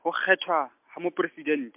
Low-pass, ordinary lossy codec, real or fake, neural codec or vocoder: 3.6 kHz; MP3, 32 kbps; real; none